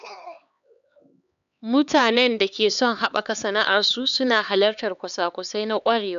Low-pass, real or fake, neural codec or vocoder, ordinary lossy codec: 7.2 kHz; fake; codec, 16 kHz, 4 kbps, X-Codec, HuBERT features, trained on LibriSpeech; none